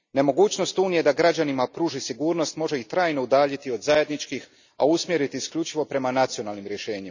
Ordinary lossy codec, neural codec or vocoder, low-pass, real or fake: none; none; 7.2 kHz; real